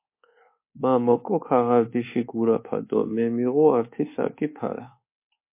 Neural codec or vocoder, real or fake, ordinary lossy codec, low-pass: codec, 24 kHz, 1.2 kbps, DualCodec; fake; MP3, 24 kbps; 3.6 kHz